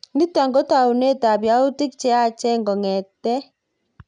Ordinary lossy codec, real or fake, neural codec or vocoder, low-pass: none; real; none; 9.9 kHz